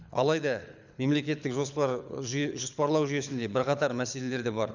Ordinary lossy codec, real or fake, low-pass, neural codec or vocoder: none; fake; 7.2 kHz; codec, 24 kHz, 6 kbps, HILCodec